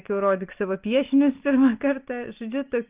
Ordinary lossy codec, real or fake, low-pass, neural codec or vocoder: Opus, 32 kbps; real; 3.6 kHz; none